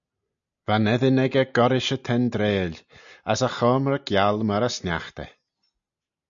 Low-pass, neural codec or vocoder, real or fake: 7.2 kHz; none; real